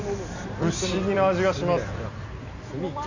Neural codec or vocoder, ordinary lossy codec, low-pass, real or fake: vocoder, 44.1 kHz, 128 mel bands every 256 samples, BigVGAN v2; none; 7.2 kHz; fake